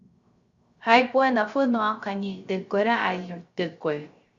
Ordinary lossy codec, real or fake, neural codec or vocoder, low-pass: Opus, 64 kbps; fake; codec, 16 kHz, 0.3 kbps, FocalCodec; 7.2 kHz